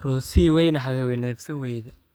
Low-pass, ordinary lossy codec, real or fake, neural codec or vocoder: none; none; fake; codec, 44.1 kHz, 2.6 kbps, SNAC